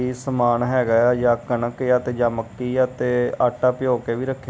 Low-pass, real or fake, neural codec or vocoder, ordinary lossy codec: none; real; none; none